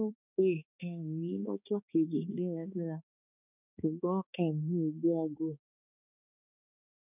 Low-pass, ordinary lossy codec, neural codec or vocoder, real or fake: 3.6 kHz; none; codec, 16 kHz, 2 kbps, X-Codec, HuBERT features, trained on balanced general audio; fake